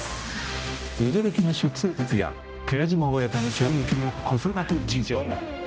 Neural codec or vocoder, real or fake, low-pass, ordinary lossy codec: codec, 16 kHz, 0.5 kbps, X-Codec, HuBERT features, trained on general audio; fake; none; none